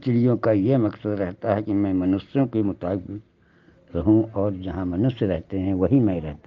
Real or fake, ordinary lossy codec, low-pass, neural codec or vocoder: real; Opus, 32 kbps; 7.2 kHz; none